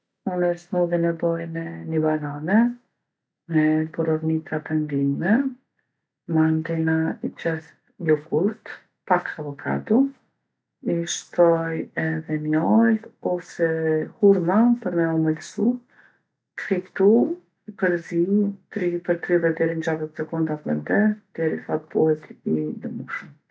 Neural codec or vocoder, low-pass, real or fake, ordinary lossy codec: none; none; real; none